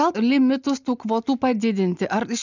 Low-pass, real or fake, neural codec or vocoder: 7.2 kHz; real; none